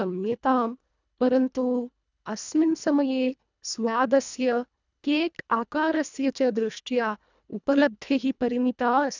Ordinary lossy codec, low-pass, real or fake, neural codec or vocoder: none; 7.2 kHz; fake; codec, 24 kHz, 1.5 kbps, HILCodec